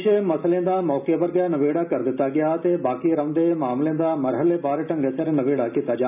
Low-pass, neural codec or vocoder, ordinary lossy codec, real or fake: 3.6 kHz; none; none; real